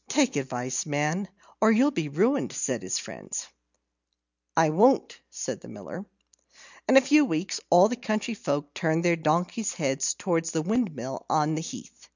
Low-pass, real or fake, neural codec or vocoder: 7.2 kHz; real; none